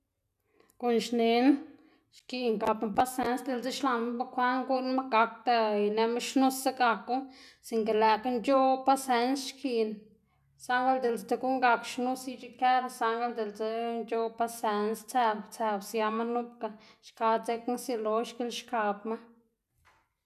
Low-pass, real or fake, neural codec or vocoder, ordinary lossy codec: 14.4 kHz; real; none; none